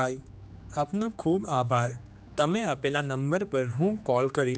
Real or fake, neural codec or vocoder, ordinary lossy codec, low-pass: fake; codec, 16 kHz, 2 kbps, X-Codec, HuBERT features, trained on general audio; none; none